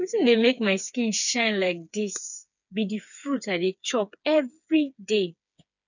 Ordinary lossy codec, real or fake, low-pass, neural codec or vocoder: none; fake; 7.2 kHz; codec, 16 kHz, 4 kbps, FreqCodec, smaller model